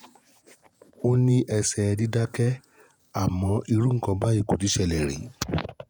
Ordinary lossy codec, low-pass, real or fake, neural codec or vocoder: none; none; fake; vocoder, 48 kHz, 128 mel bands, Vocos